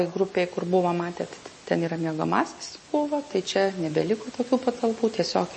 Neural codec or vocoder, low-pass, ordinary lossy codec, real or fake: none; 10.8 kHz; MP3, 32 kbps; real